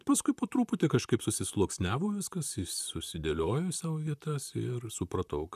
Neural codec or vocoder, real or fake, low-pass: vocoder, 44.1 kHz, 128 mel bands, Pupu-Vocoder; fake; 14.4 kHz